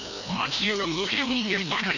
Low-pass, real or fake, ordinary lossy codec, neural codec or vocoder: 7.2 kHz; fake; none; codec, 16 kHz, 1 kbps, FreqCodec, larger model